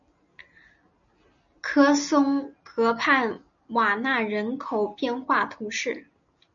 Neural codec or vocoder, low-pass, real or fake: none; 7.2 kHz; real